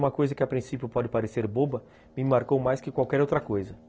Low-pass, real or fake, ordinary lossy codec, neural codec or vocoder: none; real; none; none